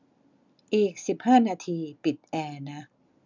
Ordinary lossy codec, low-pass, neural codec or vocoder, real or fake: none; 7.2 kHz; none; real